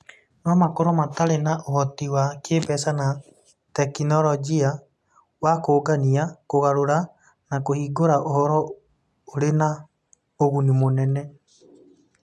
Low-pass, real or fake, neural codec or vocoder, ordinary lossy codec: none; real; none; none